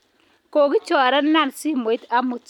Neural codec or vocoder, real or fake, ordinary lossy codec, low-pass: none; real; none; 19.8 kHz